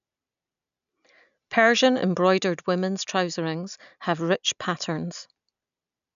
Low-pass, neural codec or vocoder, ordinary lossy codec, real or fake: 7.2 kHz; none; none; real